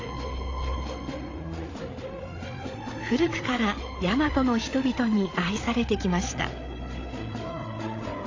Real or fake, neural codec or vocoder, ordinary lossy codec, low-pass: fake; codec, 16 kHz, 8 kbps, FreqCodec, larger model; AAC, 32 kbps; 7.2 kHz